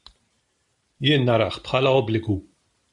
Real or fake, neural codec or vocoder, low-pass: real; none; 10.8 kHz